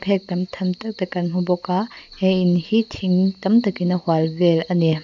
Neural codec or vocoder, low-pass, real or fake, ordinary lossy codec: none; 7.2 kHz; real; none